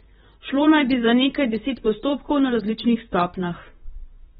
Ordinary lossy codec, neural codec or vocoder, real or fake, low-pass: AAC, 16 kbps; vocoder, 44.1 kHz, 128 mel bands, Pupu-Vocoder; fake; 19.8 kHz